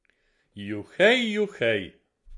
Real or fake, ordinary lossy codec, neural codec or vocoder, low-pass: real; MP3, 48 kbps; none; 10.8 kHz